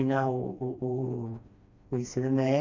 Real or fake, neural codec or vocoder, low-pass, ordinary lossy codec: fake; codec, 16 kHz, 2 kbps, FreqCodec, smaller model; 7.2 kHz; Opus, 64 kbps